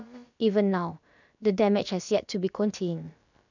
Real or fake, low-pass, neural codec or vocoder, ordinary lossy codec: fake; 7.2 kHz; codec, 16 kHz, about 1 kbps, DyCAST, with the encoder's durations; none